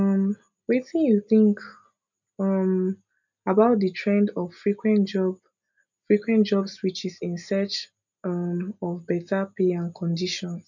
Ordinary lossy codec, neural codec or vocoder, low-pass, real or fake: none; none; 7.2 kHz; real